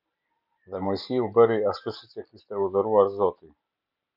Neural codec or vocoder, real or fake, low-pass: none; real; 5.4 kHz